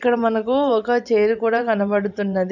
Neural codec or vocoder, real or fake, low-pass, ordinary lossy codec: vocoder, 44.1 kHz, 128 mel bands every 512 samples, BigVGAN v2; fake; 7.2 kHz; none